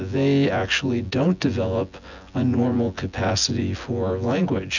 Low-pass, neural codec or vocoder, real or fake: 7.2 kHz; vocoder, 24 kHz, 100 mel bands, Vocos; fake